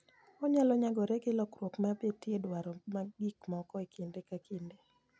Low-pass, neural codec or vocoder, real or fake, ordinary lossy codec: none; none; real; none